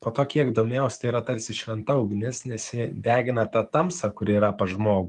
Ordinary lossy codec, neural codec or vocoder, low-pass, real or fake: Opus, 32 kbps; codec, 44.1 kHz, 7.8 kbps, DAC; 10.8 kHz; fake